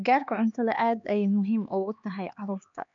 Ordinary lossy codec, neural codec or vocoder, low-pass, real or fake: none; codec, 16 kHz, 2 kbps, X-Codec, HuBERT features, trained on LibriSpeech; 7.2 kHz; fake